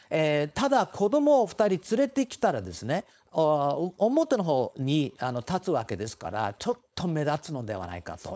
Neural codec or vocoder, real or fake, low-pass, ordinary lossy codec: codec, 16 kHz, 4.8 kbps, FACodec; fake; none; none